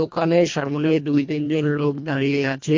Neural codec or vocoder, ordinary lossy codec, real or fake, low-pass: codec, 24 kHz, 1.5 kbps, HILCodec; MP3, 48 kbps; fake; 7.2 kHz